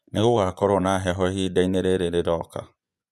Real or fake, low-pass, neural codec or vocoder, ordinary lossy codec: fake; none; vocoder, 24 kHz, 100 mel bands, Vocos; none